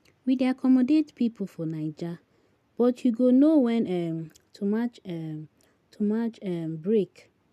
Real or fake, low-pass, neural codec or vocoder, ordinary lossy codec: real; 14.4 kHz; none; none